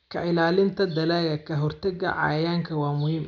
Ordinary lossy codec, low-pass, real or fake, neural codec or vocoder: none; 7.2 kHz; real; none